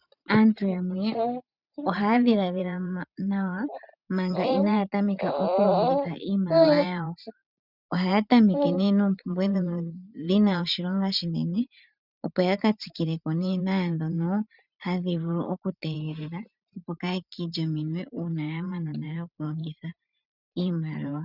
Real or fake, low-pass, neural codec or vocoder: fake; 5.4 kHz; vocoder, 44.1 kHz, 128 mel bands every 512 samples, BigVGAN v2